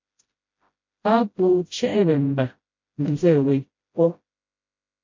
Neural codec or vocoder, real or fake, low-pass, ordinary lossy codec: codec, 16 kHz, 0.5 kbps, FreqCodec, smaller model; fake; 7.2 kHz; MP3, 48 kbps